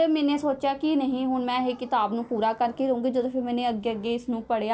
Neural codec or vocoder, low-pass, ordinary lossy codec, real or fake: none; none; none; real